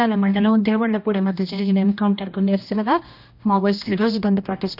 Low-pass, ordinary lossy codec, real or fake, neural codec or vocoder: 5.4 kHz; none; fake; codec, 16 kHz, 1 kbps, X-Codec, HuBERT features, trained on general audio